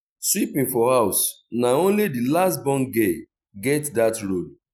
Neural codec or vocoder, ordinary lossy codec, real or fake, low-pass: none; none; real; 19.8 kHz